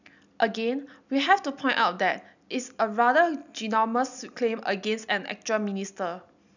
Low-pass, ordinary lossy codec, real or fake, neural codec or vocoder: 7.2 kHz; none; real; none